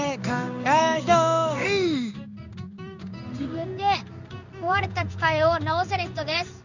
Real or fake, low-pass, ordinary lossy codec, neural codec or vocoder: fake; 7.2 kHz; none; codec, 16 kHz in and 24 kHz out, 1 kbps, XY-Tokenizer